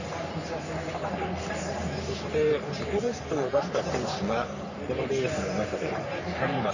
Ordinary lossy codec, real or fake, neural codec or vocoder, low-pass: none; fake; codec, 44.1 kHz, 3.4 kbps, Pupu-Codec; 7.2 kHz